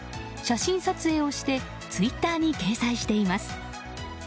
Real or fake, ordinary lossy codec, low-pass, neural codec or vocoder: real; none; none; none